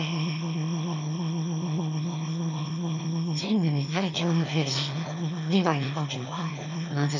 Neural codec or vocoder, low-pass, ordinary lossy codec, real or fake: autoencoder, 22.05 kHz, a latent of 192 numbers a frame, VITS, trained on one speaker; 7.2 kHz; none; fake